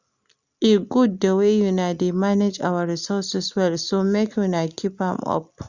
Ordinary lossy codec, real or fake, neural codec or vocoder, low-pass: Opus, 64 kbps; real; none; 7.2 kHz